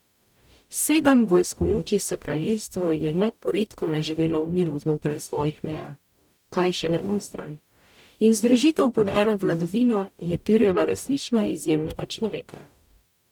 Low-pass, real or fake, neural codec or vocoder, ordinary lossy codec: 19.8 kHz; fake; codec, 44.1 kHz, 0.9 kbps, DAC; none